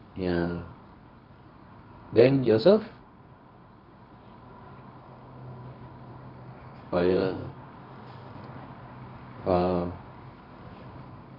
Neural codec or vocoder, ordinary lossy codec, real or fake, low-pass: codec, 24 kHz, 0.9 kbps, WavTokenizer, medium music audio release; none; fake; 5.4 kHz